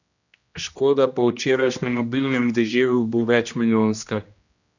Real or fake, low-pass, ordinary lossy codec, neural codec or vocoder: fake; 7.2 kHz; none; codec, 16 kHz, 1 kbps, X-Codec, HuBERT features, trained on general audio